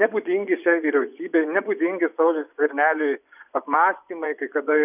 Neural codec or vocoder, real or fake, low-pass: vocoder, 24 kHz, 100 mel bands, Vocos; fake; 3.6 kHz